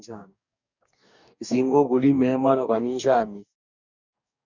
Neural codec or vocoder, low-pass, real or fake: codec, 44.1 kHz, 2.6 kbps, DAC; 7.2 kHz; fake